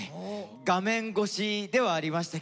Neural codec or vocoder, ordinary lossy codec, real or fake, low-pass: none; none; real; none